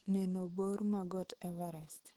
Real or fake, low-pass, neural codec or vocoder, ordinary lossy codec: fake; 19.8 kHz; autoencoder, 48 kHz, 128 numbers a frame, DAC-VAE, trained on Japanese speech; Opus, 16 kbps